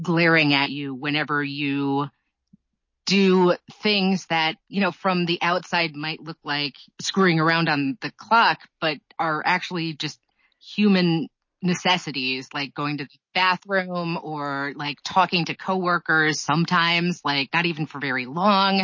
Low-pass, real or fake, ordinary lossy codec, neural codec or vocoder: 7.2 kHz; real; MP3, 32 kbps; none